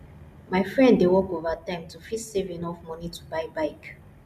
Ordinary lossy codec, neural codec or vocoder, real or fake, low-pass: none; none; real; 14.4 kHz